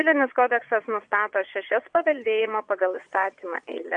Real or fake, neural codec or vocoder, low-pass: fake; vocoder, 22.05 kHz, 80 mel bands, Vocos; 9.9 kHz